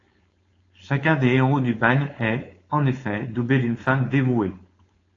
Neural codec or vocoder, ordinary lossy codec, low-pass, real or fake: codec, 16 kHz, 4.8 kbps, FACodec; AAC, 32 kbps; 7.2 kHz; fake